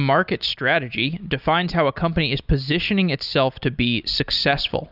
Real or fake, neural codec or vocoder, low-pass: real; none; 5.4 kHz